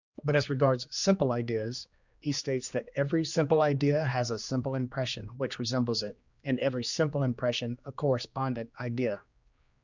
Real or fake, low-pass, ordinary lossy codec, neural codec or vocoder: fake; 7.2 kHz; Opus, 64 kbps; codec, 16 kHz, 2 kbps, X-Codec, HuBERT features, trained on general audio